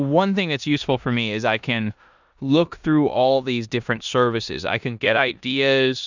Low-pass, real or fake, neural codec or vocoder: 7.2 kHz; fake; codec, 16 kHz in and 24 kHz out, 0.9 kbps, LongCat-Audio-Codec, four codebook decoder